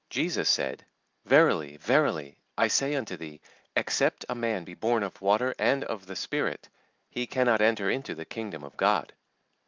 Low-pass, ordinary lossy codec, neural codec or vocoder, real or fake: 7.2 kHz; Opus, 24 kbps; none; real